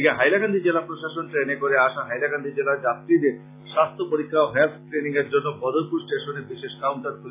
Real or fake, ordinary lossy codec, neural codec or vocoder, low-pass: real; AAC, 24 kbps; none; 3.6 kHz